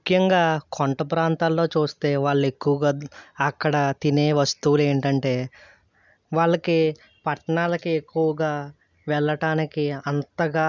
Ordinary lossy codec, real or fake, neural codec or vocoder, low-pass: none; real; none; 7.2 kHz